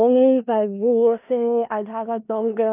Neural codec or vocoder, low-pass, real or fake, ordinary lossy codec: codec, 16 kHz in and 24 kHz out, 0.4 kbps, LongCat-Audio-Codec, four codebook decoder; 3.6 kHz; fake; none